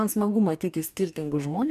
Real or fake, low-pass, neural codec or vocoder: fake; 14.4 kHz; codec, 44.1 kHz, 2.6 kbps, DAC